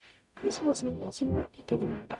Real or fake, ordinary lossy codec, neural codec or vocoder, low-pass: fake; none; codec, 44.1 kHz, 0.9 kbps, DAC; 10.8 kHz